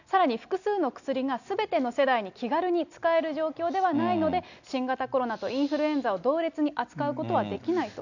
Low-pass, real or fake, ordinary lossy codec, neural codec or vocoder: 7.2 kHz; real; none; none